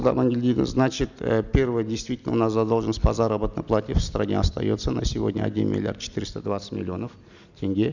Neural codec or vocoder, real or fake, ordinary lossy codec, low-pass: none; real; none; 7.2 kHz